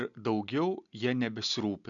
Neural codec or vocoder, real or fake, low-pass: none; real; 7.2 kHz